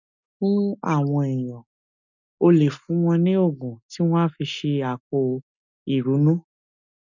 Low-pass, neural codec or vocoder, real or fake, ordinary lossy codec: 7.2 kHz; none; real; none